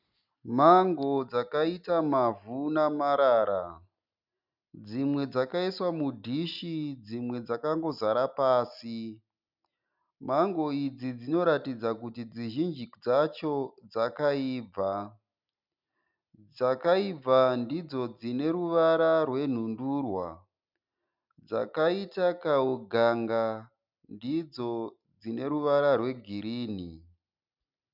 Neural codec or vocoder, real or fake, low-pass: none; real; 5.4 kHz